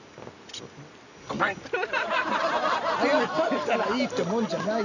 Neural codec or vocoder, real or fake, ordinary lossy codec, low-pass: none; real; none; 7.2 kHz